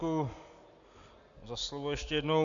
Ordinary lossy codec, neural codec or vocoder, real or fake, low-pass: AAC, 64 kbps; none; real; 7.2 kHz